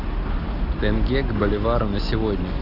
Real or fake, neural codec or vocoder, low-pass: real; none; 5.4 kHz